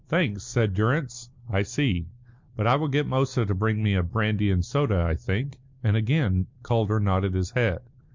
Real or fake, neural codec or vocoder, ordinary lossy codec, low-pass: real; none; MP3, 64 kbps; 7.2 kHz